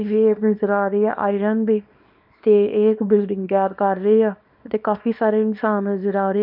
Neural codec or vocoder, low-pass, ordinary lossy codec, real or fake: codec, 24 kHz, 0.9 kbps, WavTokenizer, small release; 5.4 kHz; none; fake